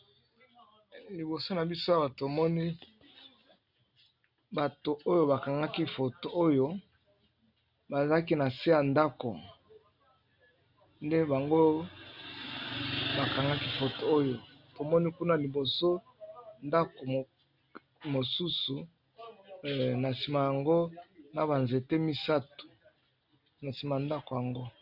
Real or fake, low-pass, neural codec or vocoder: real; 5.4 kHz; none